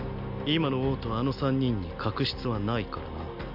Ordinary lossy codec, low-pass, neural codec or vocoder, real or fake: AAC, 48 kbps; 5.4 kHz; none; real